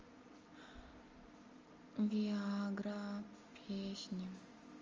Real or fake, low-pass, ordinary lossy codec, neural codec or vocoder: real; 7.2 kHz; Opus, 32 kbps; none